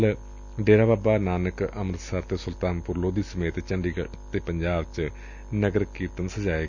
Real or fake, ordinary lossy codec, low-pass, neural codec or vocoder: real; none; 7.2 kHz; none